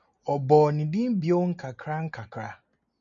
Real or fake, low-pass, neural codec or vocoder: real; 7.2 kHz; none